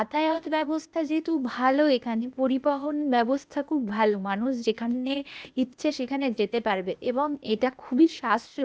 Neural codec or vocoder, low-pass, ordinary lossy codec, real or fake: codec, 16 kHz, 0.8 kbps, ZipCodec; none; none; fake